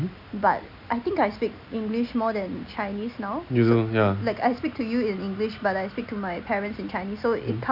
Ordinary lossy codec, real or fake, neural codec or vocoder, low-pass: none; real; none; 5.4 kHz